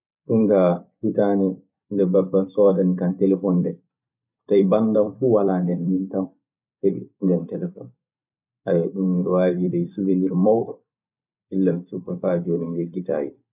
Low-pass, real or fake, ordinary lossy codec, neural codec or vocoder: 3.6 kHz; fake; none; vocoder, 44.1 kHz, 128 mel bands every 256 samples, BigVGAN v2